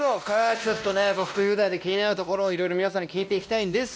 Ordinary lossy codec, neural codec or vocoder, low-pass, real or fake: none; codec, 16 kHz, 1 kbps, X-Codec, WavLM features, trained on Multilingual LibriSpeech; none; fake